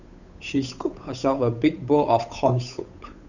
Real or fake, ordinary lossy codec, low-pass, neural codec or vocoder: fake; none; 7.2 kHz; codec, 16 kHz, 8 kbps, FunCodec, trained on Chinese and English, 25 frames a second